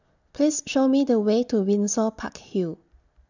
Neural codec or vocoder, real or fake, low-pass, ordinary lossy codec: none; real; 7.2 kHz; none